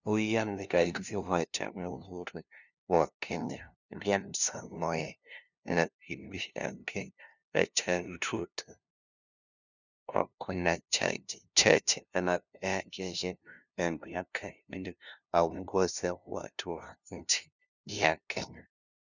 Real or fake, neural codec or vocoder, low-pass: fake; codec, 16 kHz, 0.5 kbps, FunCodec, trained on LibriTTS, 25 frames a second; 7.2 kHz